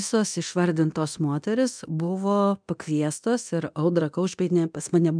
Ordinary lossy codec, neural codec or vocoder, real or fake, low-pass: MP3, 96 kbps; codec, 24 kHz, 0.9 kbps, DualCodec; fake; 9.9 kHz